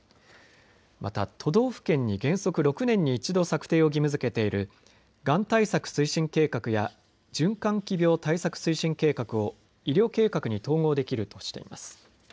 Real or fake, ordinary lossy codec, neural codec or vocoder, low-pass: real; none; none; none